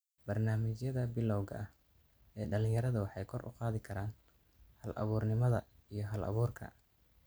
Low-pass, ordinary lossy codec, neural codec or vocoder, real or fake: none; none; none; real